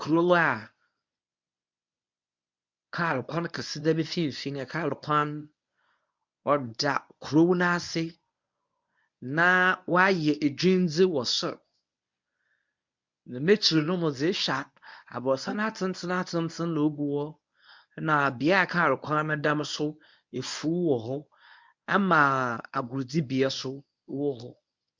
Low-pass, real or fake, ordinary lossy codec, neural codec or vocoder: 7.2 kHz; fake; MP3, 64 kbps; codec, 24 kHz, 0.9 kbps, WavTokenizer, medium speech release version 1